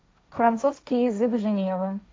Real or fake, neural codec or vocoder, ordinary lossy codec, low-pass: fake; codec, 16 kHz, 1.1 kbps, Voila-Tokenizer; none; none